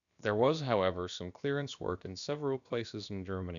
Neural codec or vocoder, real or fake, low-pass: codec, 16 kHz, about 1 kbps, DyCAST, with the encoder's durations; fake; 7.2 kHz